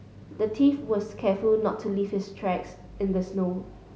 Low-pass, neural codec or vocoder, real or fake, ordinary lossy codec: none; none; real; none